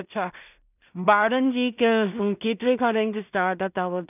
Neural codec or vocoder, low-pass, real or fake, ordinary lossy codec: codec, 16 kHz in and 24 kHz out, 0.4 kbps, LongCat-Audio-Codec, two codebook decoder; 3.6 kHz; fake; none